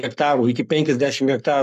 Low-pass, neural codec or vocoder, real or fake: 14.4 kHz; codec, 44.1 kHz, 7.8 kbps, Pupu-Codec; fake